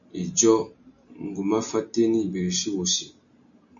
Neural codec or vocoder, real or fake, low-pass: none; real; 7.2 kHz